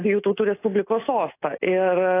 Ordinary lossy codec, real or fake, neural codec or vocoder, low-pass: AAC, 24 kbps; real; none; 3.6 kHz